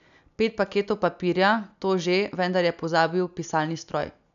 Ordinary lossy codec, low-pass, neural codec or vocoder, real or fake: none; 7.2 kHz; none; real